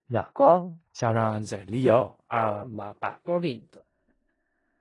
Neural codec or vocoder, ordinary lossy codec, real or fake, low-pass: codec, 16 kHz in and 24 kHz out, 0.4 kbps, LongCat-Audio-Codec, four codebook decoder; AAC, 32 kbps; fake; 10.8 kHz